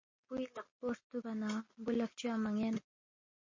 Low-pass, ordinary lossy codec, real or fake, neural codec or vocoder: 7.2 kHz; MP3, 32 kbps; real; none